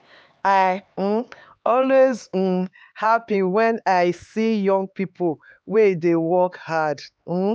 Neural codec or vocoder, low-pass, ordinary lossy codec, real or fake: codec, 16 kHz, 4 kbps, X-Codec, HuBERT features, trained on LibriSpeech; none; none; fake